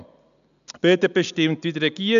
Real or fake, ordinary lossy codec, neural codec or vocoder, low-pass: real; none; none; 7.2 kHz